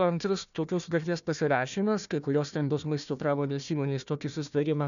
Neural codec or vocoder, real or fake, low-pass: codec, 16 kHz, 1 kbps, FunCodec, trained on Chinese and English, 50 frames a second; fake; 7.2 kHz